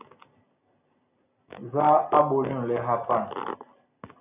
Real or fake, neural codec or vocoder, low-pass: real; none; 3.6 kHz